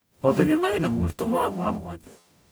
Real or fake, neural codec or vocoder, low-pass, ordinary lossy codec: fake; codec, 44.1 kHz, 0.9 kbps, DAC; none; none